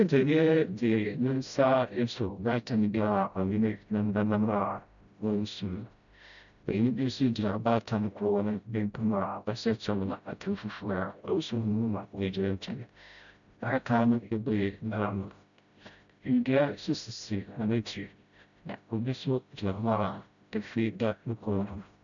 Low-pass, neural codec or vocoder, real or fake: 7.2 kHz; codec, 16 kHz, 0.5 kbps, FreqCodec, smaller model; fake